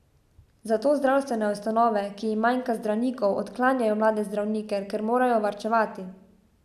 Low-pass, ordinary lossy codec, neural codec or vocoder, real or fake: 14.4 kHz; none; none; real